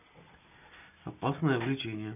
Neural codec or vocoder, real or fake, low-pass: none; real; 3.6 kHz